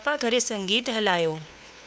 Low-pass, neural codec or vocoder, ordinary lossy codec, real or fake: none; codec, 16 kHz, 2 kbps, FunCodec, trained on LibriTTS, 25 frames a second; none; fake